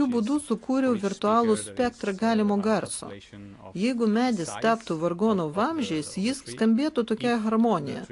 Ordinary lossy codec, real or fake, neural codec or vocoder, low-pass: AAC, 48 kbps; real; none; 10.8 kHz